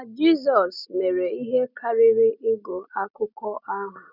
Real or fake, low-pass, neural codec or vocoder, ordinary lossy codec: real; 5.4 kHz; none; none